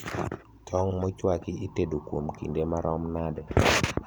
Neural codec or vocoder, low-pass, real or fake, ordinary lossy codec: vocoder, 44.1 kHz, 128 mel bands every 512 samples, BigVGAN v2; none; fake; none